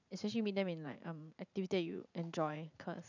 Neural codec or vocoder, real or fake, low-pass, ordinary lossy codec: vocoder, 44.1 kHz, 80 mel bands, Vocos; fake; 7.2 kHz; none